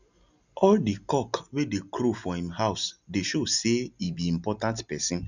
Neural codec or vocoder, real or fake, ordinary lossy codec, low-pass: none; real; none; 7.2 kHz